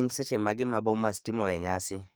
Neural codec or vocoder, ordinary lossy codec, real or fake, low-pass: codec, 44.1 kHz, 2.6 kbps, SNAC; none; fake; none